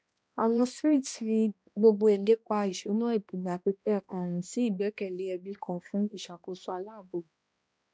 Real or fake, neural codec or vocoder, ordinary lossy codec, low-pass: fake; codec, 16 kHz, 1 kbps, X-Codec, HuBERT features, trained on balanced general audio; none; none